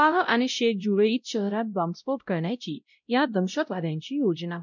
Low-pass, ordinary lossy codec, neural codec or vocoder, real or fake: 7.2 kHz; none; codec, 16 kHz, 0.5 kbps, X-Codec, WavLM features, trained on Multilingual LibriSpeech; fake